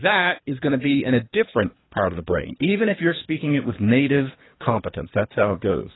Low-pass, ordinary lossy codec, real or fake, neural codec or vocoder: 7.2 kHz; AAC, 16 kbps; fake; codec, 24 kHz, 3 kbps, HILCodec